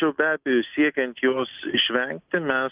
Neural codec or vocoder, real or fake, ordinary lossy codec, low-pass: none; real; Opus, 24 kbps; 3.6 kHz